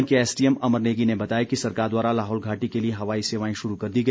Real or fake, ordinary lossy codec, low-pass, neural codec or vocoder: real; none; none; none